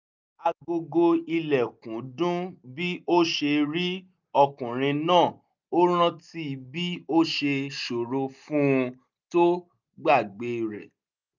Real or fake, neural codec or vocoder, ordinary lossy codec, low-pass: real; none; none; 7.2 kHz